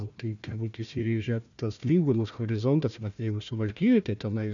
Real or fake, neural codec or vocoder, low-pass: fake; codec, 16 kHz, 1 kbps, FunCodec, trained on Chinese and English, 50 frames a second; 7.2 kHz